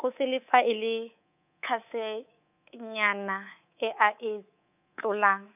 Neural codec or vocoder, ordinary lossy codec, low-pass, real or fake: codec, 24 kHz, 3.1 kbps, DualCodec; none; 3.6 kHz; fake